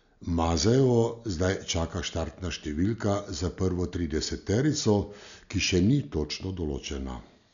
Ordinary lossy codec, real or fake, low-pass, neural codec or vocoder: none; real; 7.2 kHz; none